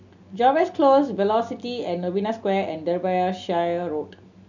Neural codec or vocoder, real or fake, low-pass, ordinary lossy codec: none; real; 7.2 kHz; none